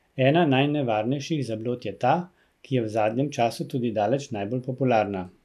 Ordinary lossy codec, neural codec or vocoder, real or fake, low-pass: none; none; real; 14.4 kHz